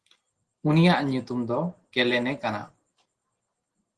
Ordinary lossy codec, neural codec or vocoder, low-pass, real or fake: Opus, 16 kbps; none; 10.8 kHz; real